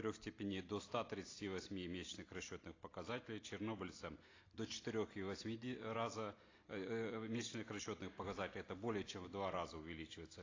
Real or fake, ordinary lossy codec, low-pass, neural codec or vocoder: real; AAC, 32 kbps; 7.2 kHz; none